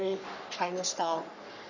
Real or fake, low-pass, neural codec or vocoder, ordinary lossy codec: fake; 7.2 kHz; codec, 44.1 kHz, 3.4 kbps, Pupu-Codec; none